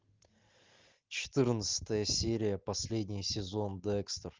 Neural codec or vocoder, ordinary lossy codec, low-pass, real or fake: none; Opus, 24 kbps; 7.2 kHz; real